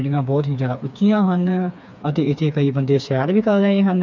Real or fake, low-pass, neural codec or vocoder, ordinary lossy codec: fake; 7.2 kHz; codec, 16 kHz, 4 kbps, FreqCodec, smaller model; none